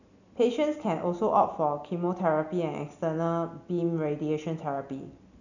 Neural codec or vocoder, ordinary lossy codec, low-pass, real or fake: none; none; 7.2 kHz; real